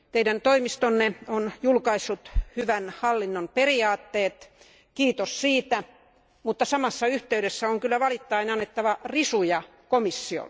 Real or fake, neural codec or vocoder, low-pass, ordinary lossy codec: real; none; none; none